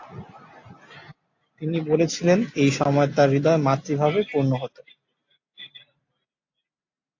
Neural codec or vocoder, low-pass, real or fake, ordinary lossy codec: none; 7.2 kHz; real; AAC, 48 kbps